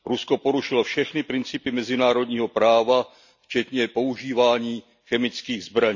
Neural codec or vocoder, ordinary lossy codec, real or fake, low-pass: none; none; real; 7.2 kHz